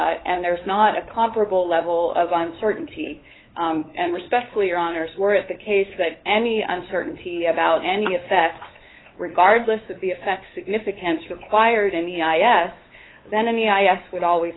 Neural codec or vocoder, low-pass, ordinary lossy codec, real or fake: codec, 16 kHz, 8 kbps, FunCodec, trained on LibriTTS, 25 frames a second; 7.2 kHz; AAC, 16 kbps; fake